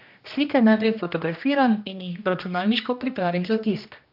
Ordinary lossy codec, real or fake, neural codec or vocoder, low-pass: AAC, 48 kbps; fake; codec, 16 kHz, 1 kbps, X-Codec, HuBERT features, trained on general audio; 5.4 kHz